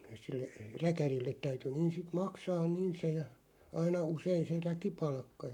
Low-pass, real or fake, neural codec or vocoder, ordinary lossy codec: 19.8 kHz; fake; codec, 44.1 kHz, 7.8 kbps, Pupu-Codec; none